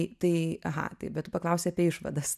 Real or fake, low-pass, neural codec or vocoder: real; 14.4 kHz; none